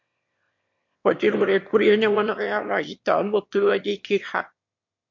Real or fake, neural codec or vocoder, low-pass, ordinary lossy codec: fake; autoencoder, 22.05 kHz, a latent of 192 numbers a frame, VITS, trained on one speaker; 7.2 kHz; MP3, 48 kbps